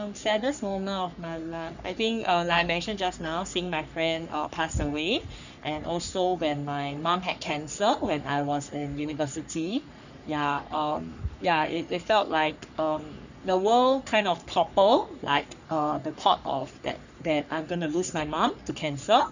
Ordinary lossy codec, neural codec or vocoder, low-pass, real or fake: none; codec, 44.1 kHz, 3.4 kbps, Pupu-Codec; 7.2 kHz; fake